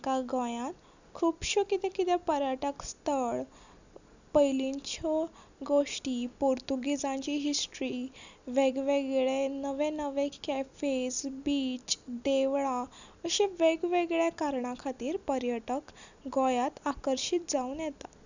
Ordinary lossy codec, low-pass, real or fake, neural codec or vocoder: none; 7.2 kHz; real; none